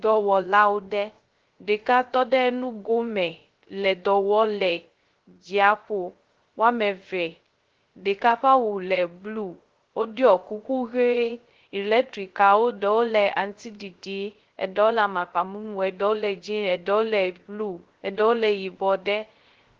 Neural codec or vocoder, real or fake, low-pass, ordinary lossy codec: codec, 16 kHz, 0.3 kbps, FocalCodec; fake; 7.2 kHz; Opus, 16 kbps